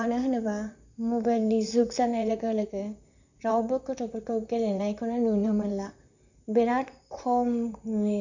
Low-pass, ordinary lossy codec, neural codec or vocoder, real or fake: 7.2 kHz; none; vocoder, 44.1 kHz, 128 mel bands, Pupu-Vocoder; fake